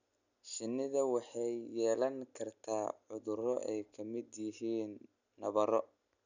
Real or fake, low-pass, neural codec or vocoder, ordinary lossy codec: real; 7.2 kHz; none; none